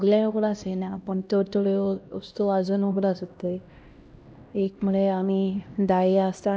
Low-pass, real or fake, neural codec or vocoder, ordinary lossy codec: none; fake; codec, 16 kHz, 1 kbps, X-Codec, HuBERT features, trained on LibriSpeech; none